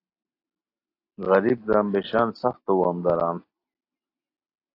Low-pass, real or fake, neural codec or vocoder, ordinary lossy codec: 5.4 kHz; real; none; AAC, 32 kbps